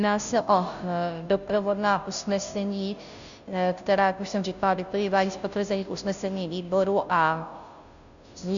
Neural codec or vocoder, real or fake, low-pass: codec, 16 kHz, 0.5 kbps, FunCodec, trained on Chinese and English, 25 frames a second; fake; 7.2 kHz